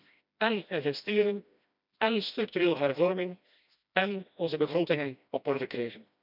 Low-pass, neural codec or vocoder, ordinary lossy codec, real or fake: 5.4 kHz; codec, 16 kHz, 1 kbps, FreqCodec, smaller model; none; fake